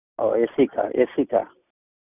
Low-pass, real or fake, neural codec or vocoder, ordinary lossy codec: 3.6 kHz; real; none; none